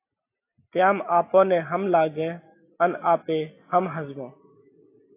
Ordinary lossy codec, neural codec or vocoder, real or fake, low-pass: AAC, 24 kbps; none; real; 3.6 kHz